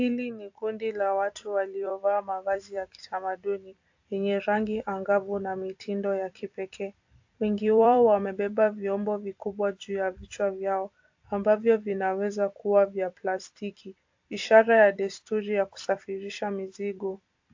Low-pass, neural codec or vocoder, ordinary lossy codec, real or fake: 7.2 kHz; vocoder, 44.1 kHz, 128 mel bands every 256 samples, BigVGAN v2; AAC, 48 kbps; fake